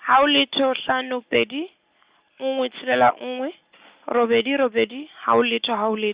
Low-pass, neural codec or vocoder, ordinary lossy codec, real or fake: 3.6 kHz; none; Opus, 64 kbps; real